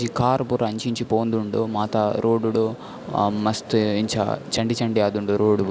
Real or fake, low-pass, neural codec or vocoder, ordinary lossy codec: real; none; none; none